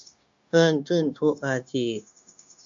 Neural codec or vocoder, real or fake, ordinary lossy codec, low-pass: codec, 16 kHz, 0.9 kbps, LongCat-Audio-Codec; fake; MP3, 64 kbps; 7.2 kHz